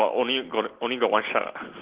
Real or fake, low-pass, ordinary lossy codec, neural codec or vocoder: real; 3.6 kHz; Opus, 16 kbps; none